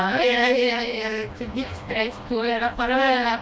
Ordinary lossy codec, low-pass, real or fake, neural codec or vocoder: none; none; fake; codec, 16 kHz, 1 kbps, FreqCodec, smaller model